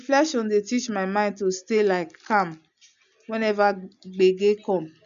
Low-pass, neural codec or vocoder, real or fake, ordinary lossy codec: 7.2 kHz; none; real; none